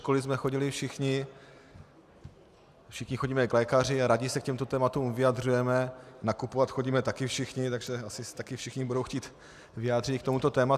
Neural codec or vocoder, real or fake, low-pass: none; real; 14.4 kHz